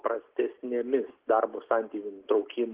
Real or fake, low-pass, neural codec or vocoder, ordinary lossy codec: real; 3.6 kHz; none; Opus, 16 kbps